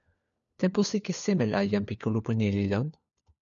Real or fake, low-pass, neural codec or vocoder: fake; 7.2 kHz; codec, 16 kHz, 4 kbps, FunCodec, trained on LibriTTS, 50 frames a second